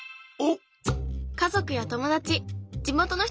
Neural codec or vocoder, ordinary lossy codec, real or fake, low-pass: none; none; real; none